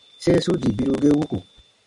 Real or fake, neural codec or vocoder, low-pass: real; none; 10.8 kHz